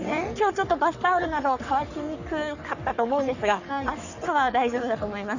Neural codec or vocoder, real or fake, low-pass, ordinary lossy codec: codec, 44.1 kHz, 3.4 kbps, Pupu-Codec; fake; 7.2 kHz; none